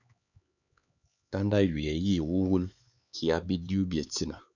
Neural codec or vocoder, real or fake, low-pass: codec, 16 kHz, 4 kbps, X-Codec, HuBERT features, trained on LibriSpeech; fake; 7.2 kHz